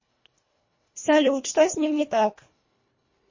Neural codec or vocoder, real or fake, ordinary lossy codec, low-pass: codec, 24 kHz, 1.5 kbps, HILCodec; fake; MP3, 32 kbps; 7.2 kHz